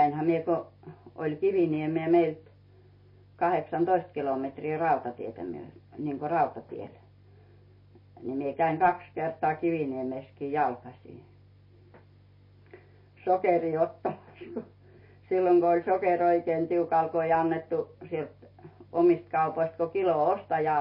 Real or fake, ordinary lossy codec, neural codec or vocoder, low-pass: real; MP3, 24 kbps; none; 5.4 kHz